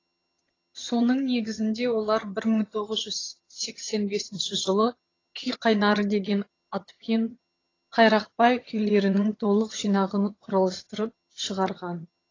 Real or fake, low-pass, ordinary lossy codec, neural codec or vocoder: fake; 7.2 kHz; AAC, 32 kbps; vocoder, 22.05 kHz, 80 mel bands, HiFi-GAN